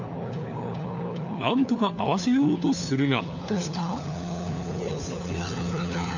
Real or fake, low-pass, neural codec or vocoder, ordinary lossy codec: fake; 7.2 kHz; codec, 16 kHz, 4 kbps, FunCodec, trained on LibriTTS, 50 frames a second; none